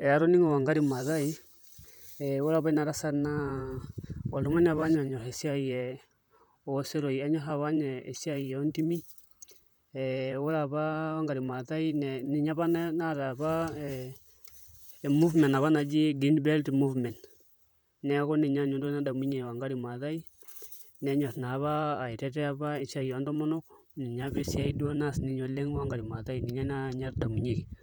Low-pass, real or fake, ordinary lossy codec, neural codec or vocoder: none; fake; none; vocoder, 44.1 kHz, 128 mel bands, Pupu-Vocoder